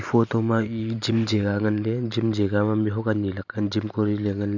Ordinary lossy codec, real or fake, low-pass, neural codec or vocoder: none; fake; 7.2 kHz; vocoder, 44.1 kHz, 128 mel bands every 512 samples, BigVGAN v2